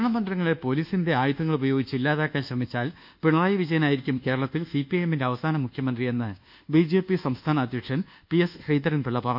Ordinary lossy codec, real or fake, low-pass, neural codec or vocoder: none; fake; 5.4 kHz; codec, 24 kHz, 1.2 kbps, DualCodec